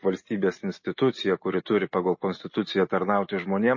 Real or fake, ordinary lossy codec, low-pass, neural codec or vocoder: real; MP3, 32 kbps; 7.2 kHz; none